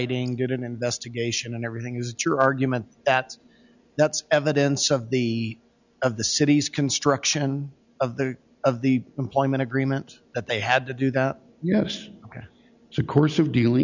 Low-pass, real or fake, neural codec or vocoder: 7.2 kHz; real; none